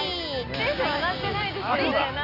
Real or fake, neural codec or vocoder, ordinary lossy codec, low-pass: real; none; Opus, 64 kbps; 5.4 kHz